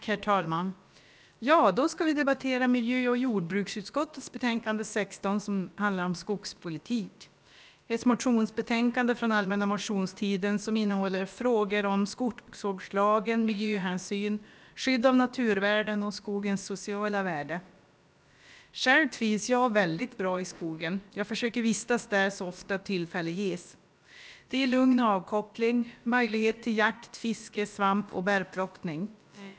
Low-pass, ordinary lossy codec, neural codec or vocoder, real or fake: none; none; codec, 16 kHz, about 1 kbps, DyCAST, with the encoder's durations; fake